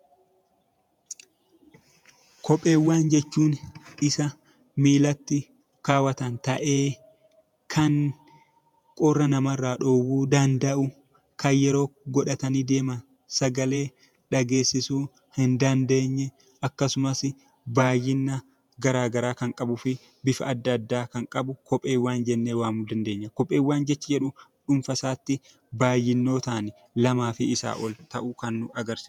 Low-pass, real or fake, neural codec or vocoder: 19.8 kHz; fake; vocoder, 48 kHz, 128 mel bands, Vocos